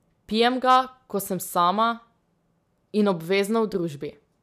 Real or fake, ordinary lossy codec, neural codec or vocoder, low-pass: real; none; none; 14.4 kHz